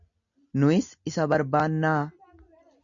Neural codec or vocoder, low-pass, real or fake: none; 7.2 kHz; real